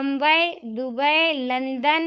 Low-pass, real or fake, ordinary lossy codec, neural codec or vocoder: none; fake; none; codec, 16 kHz, 4.8 kbps, FACodec